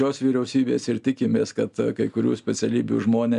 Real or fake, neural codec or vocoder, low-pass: real; none; 10.8 kHz